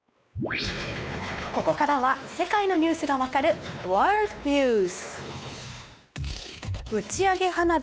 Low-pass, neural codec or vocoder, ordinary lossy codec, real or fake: none; codec, 16 kHz, 2 kbps, X-Codec, WavLM features, trained on Multilingual LibriSpeech; none; fake